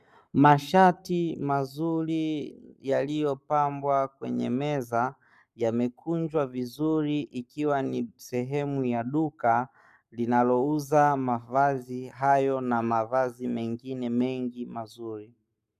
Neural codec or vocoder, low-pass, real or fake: codec, 44.1 kHz, 7.8 kbps, Pupu-Codec; 14.4 kHz; fake